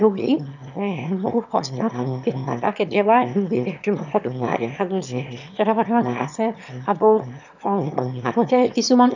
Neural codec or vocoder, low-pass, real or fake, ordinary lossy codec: autoencoder, 22.05 kHz, a latent of 192 numbers a frame, VITS, trained on one speaker; 7.2 kHz; fake; none